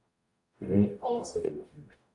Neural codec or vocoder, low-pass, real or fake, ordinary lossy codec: codec, 44.1 kHz, 0.9 kbps, DAC; 10.8 kHz; fake; AAC, 64 kbps